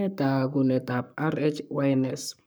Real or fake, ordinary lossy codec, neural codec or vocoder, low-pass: fake; none; codec, 44.1 kHz, 7.8 kbps, Pupu-Codec; none